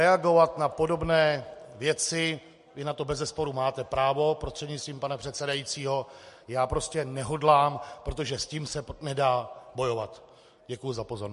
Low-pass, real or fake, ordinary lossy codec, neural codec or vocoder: 14.4 kHz; real; MP3, 48 kbps; none